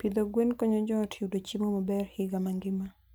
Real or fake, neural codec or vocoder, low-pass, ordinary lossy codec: real; none; none; none